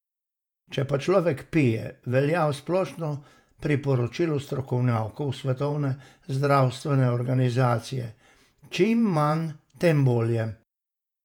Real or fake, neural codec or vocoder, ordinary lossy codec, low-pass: fake; vocoder, 48 kHz, 128 mel bands, Vocos; none; 19.8 kHz